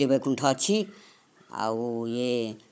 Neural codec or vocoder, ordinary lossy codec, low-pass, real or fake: codec, 16 kHz, 16 kbps, FunCodec, trained on Chinese and English, 50 frames a second; none; none; fake